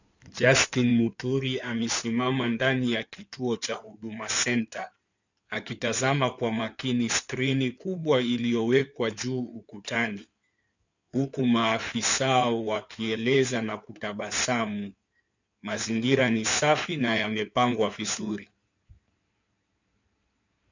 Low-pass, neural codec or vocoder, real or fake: 7.2 kHz; codec, 16 kHz in and 24 kHz out, 2.2 kbps, FireRedTTS-2 codec; fake